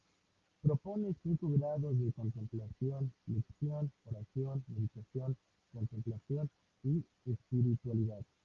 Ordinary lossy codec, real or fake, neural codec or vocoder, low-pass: Opus, 32 kbps; real; none; 7.2 kHz